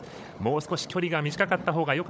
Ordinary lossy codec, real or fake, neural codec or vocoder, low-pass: none; fake; codec, 16 kHz, 16 kbps, FunCodec, trained on Chinese and English, 50 frames a second; none